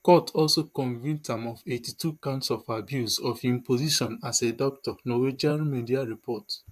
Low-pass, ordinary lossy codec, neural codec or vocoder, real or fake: 14.4 kHz; AAC, 96 kbps; vocoder, 44.1 kHz, 128 mel bands, Pupu-Vocoder; fake